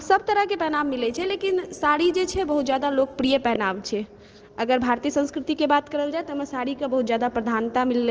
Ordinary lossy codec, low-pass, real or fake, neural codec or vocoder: Opus, 16 kbps; 7.2 kHz; real; none